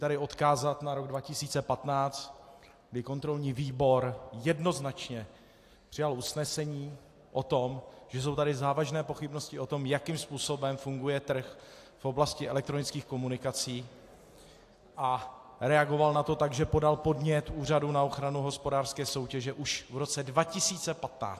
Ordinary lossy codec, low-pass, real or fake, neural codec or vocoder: AAC, 64 kbps; 14.4 kHz; real; none